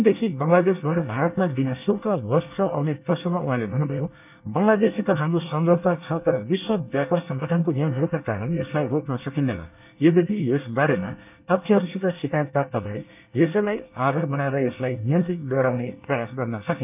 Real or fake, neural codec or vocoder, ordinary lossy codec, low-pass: fake; codec, 24 kHz, 1 kbps, SNAC; none; 3.6 kHz